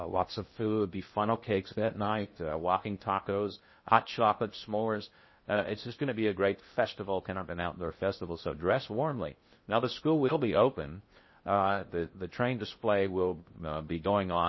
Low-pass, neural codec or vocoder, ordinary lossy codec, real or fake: 7.2 kHz; codec, 16 kHz in and 24 kHz out, 0.6 kbps, FocalCodec, streaming, 2048 codes; MP3, 24 kbps; fake